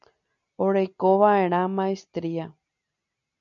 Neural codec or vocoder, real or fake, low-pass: none; real; 7.2 kHz